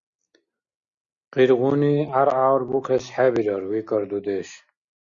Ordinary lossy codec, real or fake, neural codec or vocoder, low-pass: Opus, 64 kbps; real; none; 7.2 kHz